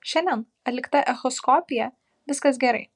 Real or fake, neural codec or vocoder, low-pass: real; none; 10.8 kHz